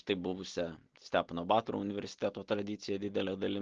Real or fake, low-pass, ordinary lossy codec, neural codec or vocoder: real; 7.2 kHz; Opus, 16 kbps; none